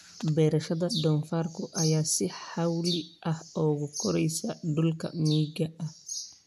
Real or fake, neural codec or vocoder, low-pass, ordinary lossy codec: real; none; 14.4 kHz; MP3, 96 kbps